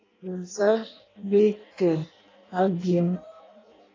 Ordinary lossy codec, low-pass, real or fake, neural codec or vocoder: AAC, 32 kbps; 7.2 kHz; fake; codec, 16 kHz in and 24 kHz out, 0.6 kbps, FireRedTTS-2 codec